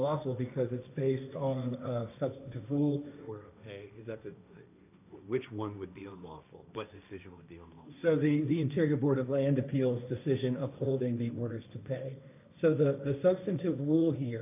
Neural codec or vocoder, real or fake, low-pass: codec, 16 kHz, 1.1 kbps, Voila-Tokenizer; fake; 3.6 kHz